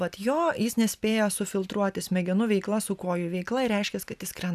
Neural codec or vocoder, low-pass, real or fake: none; 14.4 kHz; real